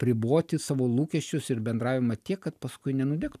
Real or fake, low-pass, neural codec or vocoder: real; 14.4 kHz; none